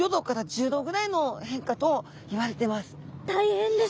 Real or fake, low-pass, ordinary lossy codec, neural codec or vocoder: real; none; none; none